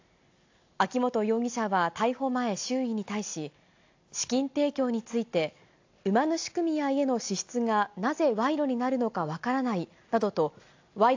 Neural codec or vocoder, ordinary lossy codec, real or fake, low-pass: none; AAC, 48 kbps; real; 7.2 kHz